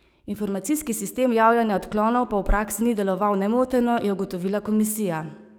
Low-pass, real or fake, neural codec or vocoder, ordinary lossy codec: none; fake; codec, 44.1 kHz, 7.8 kbps, DAC; none